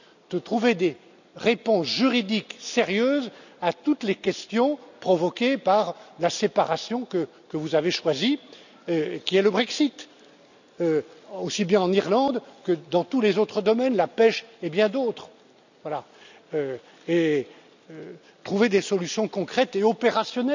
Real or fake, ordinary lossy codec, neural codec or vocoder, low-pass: real; none; none; 7.2 kHz